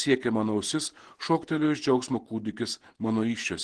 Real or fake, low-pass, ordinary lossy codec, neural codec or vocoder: fake; 10.8 kHz; Opus, 16 kbps; vocoder, 48 kHz, 128 mel bands, Vocos